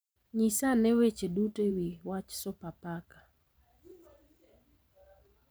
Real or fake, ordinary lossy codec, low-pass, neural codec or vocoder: fake; none; none; vocoder, 44.1 kHz, 128 mel bands every 512 samples, BigVGAN v2